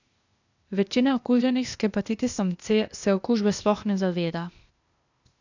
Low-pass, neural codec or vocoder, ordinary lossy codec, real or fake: 7.2 kHz; codec, 16 kHz, 0.8 kbps, ZipCodec; none; fake